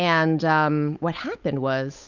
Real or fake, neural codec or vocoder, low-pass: fake; codec, 16 kHz, 8 kbps, FunCodec, trained on Chinese and English, 25 frames a second; 7.2 kHz